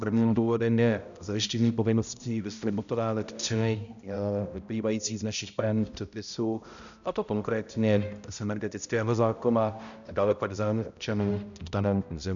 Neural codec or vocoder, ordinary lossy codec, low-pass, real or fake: codec, 16 kHz, 0.5 kbps, X-Codec, HuBERT features, trained on balanced general audio; MP3, 96 kbps; 7.2 kHz; fake